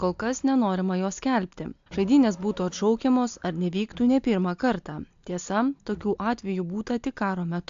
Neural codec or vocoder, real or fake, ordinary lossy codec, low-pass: none; real; AAC, 64 kbps; 7.2 kHz